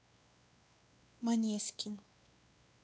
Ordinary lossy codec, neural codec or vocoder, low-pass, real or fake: none; codec, 16 kHz, 2 kbps, X-Codec, WavLM features, trained on Multilingual LibriSpeech; none; fake